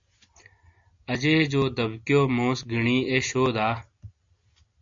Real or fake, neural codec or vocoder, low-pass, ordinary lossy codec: real; none; 7.2 kHz; AAC, 64 kbps